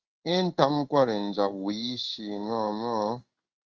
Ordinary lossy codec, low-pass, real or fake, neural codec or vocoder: Opus, 16 kbps; 7.2 kHz; fake; codec, 16 kHz in and 24 kHz out, 1 kbps, XY-Tokenizer